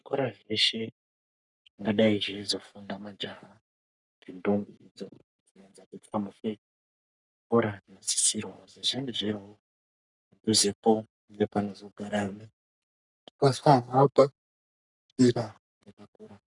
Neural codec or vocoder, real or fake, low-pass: codec, 44.1 kHz, 3.4 kbps, Pupu-Codec; fake; 10.8 kHz